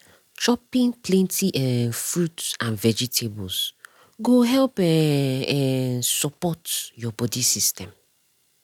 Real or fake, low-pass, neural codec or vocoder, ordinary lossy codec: real; 19.8 kHz; none; none